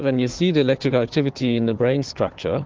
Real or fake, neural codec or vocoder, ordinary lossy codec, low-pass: fake; codec, 16 kHz, 4 kbps, FunCodec, trained on Chinese and English, 50 frames a second; Opus, 16 kbps; 7.2 kHz